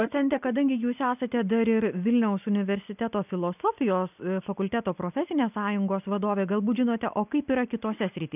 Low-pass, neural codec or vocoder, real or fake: 3.6 kHz; none; real